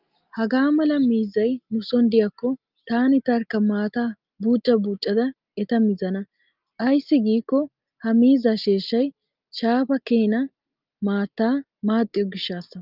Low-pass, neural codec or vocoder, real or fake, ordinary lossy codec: 5.4 kHz; none; real; Opus, 24 kbps